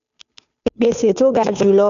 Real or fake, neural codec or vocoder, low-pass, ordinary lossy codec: fake; codec, 16 kHz, 2 kbps, FunCodec, trained on Chinese and English, 25 frames a second; 7.2 kHz; none